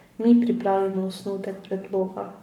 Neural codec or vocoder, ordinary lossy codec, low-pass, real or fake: codec, 44.1 kHz, 7.8 kbps, Pupu-Codec; none; 19.8 kHz; fake